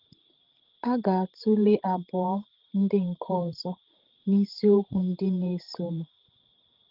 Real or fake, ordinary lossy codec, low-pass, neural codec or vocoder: fake; Opus, 16 kbps; 5.4 kHz; codec, 16 kHz, 16 kbps, FreqCodec, larger model